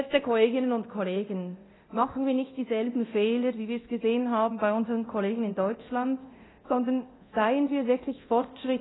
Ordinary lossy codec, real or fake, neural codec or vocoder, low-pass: AAC, 16 kbps; fake; codec, 24 kHz, 0.9 kbps, DualCodec; 7.2 kHz